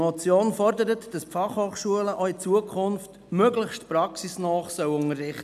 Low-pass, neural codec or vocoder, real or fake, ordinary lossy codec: 14.4 kHz; none; real; none